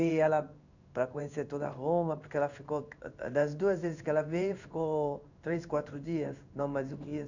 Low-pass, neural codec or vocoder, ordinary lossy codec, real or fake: 7.2 kHz; codec, 16 kHz in and 24 kHz out, 1 kbps, XY-Tokenizer; none; fake